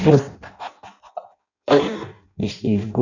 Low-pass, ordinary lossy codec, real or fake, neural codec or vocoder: 7.2 kHz; none; fake; codec, 16 kHz in and 24 kHz out, 0.6 kbps, FireRedTTS-2 codec